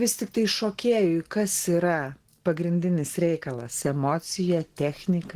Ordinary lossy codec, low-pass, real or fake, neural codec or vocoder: Opus, 16 kbps; 14.4 kHz; real; none